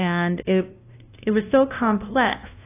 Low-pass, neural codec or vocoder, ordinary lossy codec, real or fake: 3.6 kHz; codec, 16 kHz, 0.5 kbps, FunCodec, trained on LibriTTS, 25 frames a second; AAC, 24 kbps; fake